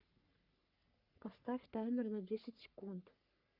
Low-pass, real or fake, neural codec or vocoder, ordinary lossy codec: 5.4 kHz; fake; codec, 44.1 kHz, 3.4 kbps, Pupu-Codec; Opus, 64 kbps